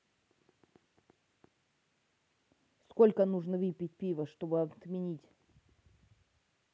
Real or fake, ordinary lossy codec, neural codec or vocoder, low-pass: real; none; none; none